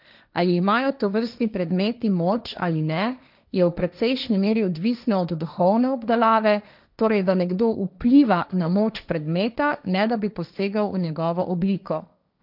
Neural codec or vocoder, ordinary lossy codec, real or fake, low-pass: codec, 16 kHz, 1.1 kbps, Voila-Tokenizer; none; fake; 5.4 kHz